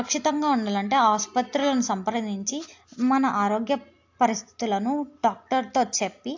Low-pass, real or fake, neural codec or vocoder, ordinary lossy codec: 7.2 kHz; real; none; AAC, 48 kbps